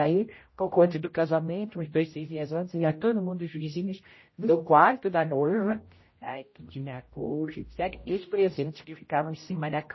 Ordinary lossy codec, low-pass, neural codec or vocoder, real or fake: MP3, 24 kbps; 7.2 kHz; codec, 16 kHz, 0.5 kbps, X-Codec, HuBERT features, trained on general audio; fake